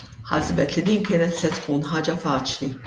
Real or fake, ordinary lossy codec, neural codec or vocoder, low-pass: fake; Opus, 32 kbps; vocoder, 48 kHz, 128 mel bands, Vocos; 9.9 kHz